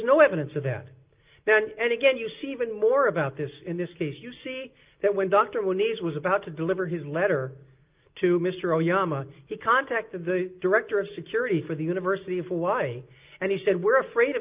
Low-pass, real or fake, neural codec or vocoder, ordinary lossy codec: 3.6 kHz; real; none; Opus, 24 kbps